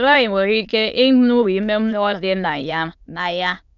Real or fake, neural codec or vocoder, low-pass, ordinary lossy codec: fake; autoencoder, 22.05 kHz, a latent of 192 numbers a frame, VITS, trained on many speakers; 7.2 kHz; none